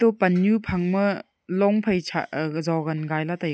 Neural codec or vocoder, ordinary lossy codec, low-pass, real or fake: none; none; none; real